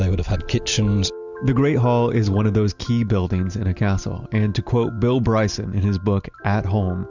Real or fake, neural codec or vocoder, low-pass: real; none; 7.2 kHz